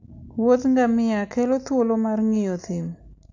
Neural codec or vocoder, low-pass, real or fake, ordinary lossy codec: none; 7.2 kHz; real; AAC, 48 kbps